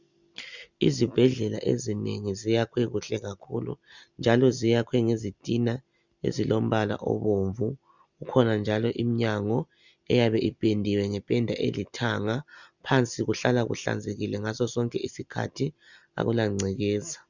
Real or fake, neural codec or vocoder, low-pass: real; none; 7.2 kHz